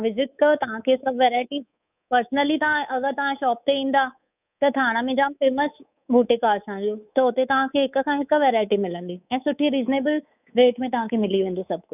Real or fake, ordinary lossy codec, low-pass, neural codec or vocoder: fake; none; 3.6 kHz; codec, 24 kHz, 3.1 kbps, DualCodec